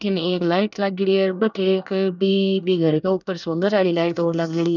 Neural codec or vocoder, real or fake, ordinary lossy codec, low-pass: codec, 24 kHz, 1 kbps, SNAC; fake; none; 7.2 kHz